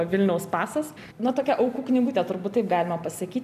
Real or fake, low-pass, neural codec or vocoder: real; 14.4 kHz; none